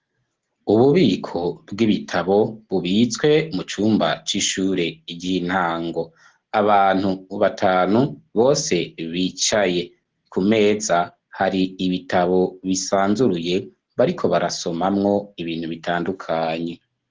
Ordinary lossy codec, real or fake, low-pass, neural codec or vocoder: Opus, 16 kbps; real; 7.2 kHz; none